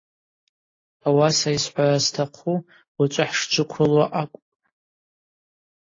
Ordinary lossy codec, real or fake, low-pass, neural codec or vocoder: AAC, 32 kbps; real; 7.2 kHz; none